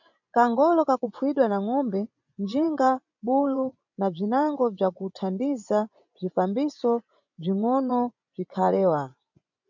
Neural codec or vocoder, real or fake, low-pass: vocoder, 24 kHz, 100 mel bands, Vocos; fake; 7.2 kHz